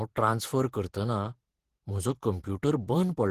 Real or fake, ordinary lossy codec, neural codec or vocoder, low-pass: fake; Opus, 16 kbps; vocoder, 48 kHz, 128 mel bands, Vocos; 14.4 kHz